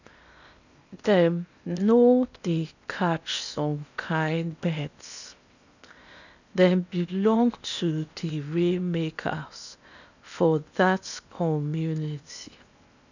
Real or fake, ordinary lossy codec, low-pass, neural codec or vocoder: fake; none; 7.2 kHz; codec, 16 kHz in and 24 kHz out, 0.8 kbps, FocalCodec, streaming, 65536 codes